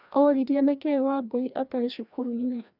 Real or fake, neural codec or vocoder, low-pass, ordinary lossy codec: fake; codec, 16 kHz, 1 kbps, FreqCodec, larger model; 5.4 kHz; Opus, 64 kbps